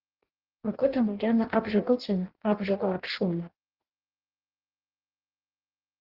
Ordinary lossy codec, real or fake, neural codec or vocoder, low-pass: Opus, 16 kbps; fake; codec, 16 kHz in and 24 kHz out, 0.6 kbps, FireRedTTS-2 codec; 5.4 kHz